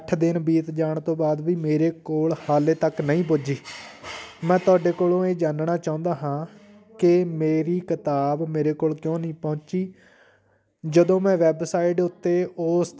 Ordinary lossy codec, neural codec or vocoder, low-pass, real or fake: none; none; none; real